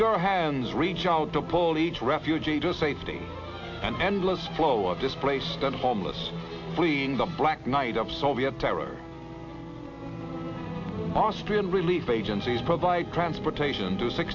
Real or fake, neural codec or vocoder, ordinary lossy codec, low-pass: real; none; AAC, 48 kbps; 7.2 kHz